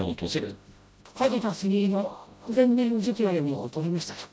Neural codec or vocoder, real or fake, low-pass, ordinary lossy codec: codec, 16 kHz, 0.5 kbps, FreqCodec, smaller model; fake; none; none